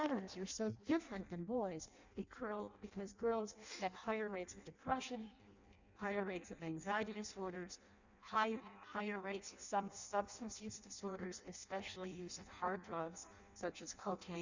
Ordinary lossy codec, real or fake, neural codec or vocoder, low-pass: Opus, 64 kbps; fake; codec, 16 kHz in and 24 kHz out, 0.6 kbps, FireRedTTS-2 codec; 7.2 kHz